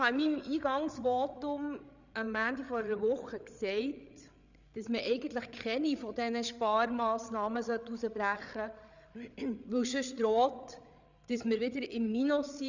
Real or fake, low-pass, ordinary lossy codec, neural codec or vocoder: fake; 7.2 kHz; none; codec, 16 kHz, 8 kbps, FreqCodec, larger model